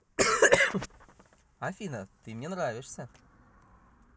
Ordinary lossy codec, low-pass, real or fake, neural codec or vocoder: none; none; real; none